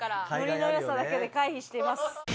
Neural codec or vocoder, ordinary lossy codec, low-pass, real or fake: none; none; none; real